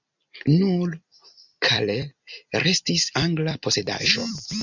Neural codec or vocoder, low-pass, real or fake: vocoder, 44.1 kHz, 128 mel bands every 256 samples, BigVGAN v2; 7.2 kHz; fake